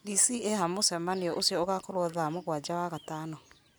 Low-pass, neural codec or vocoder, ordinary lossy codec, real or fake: none; none; none; real